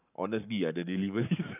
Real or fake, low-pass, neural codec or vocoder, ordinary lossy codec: fake; 3.6 kHz; codec, 44.1 kHz, 7.8 kbps, Pupu-Codec; none